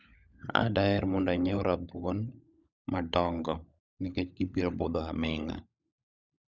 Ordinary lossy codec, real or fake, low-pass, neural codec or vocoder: none; fake; 7.2 kHz; codec, 16 kHz, 16 kbps, FunCodec, trained on LibriTTS, 50 frames a second